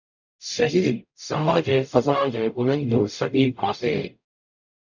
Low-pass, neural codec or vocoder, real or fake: 7.2 kHz; codec, 44.1 kHz, 0.9 kbps, DAC; fake